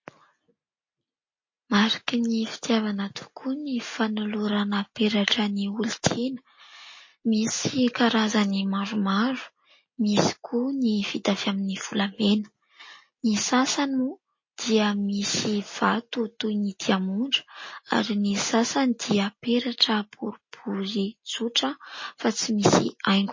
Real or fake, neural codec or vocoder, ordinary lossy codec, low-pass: real; none; MP3, 32 kbps; 7.2 kHz